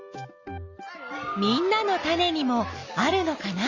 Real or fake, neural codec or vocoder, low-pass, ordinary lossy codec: real; none; 7.2 kHz; Opus, 64 kbps